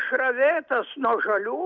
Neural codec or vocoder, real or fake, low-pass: none; real; 7.2 kHz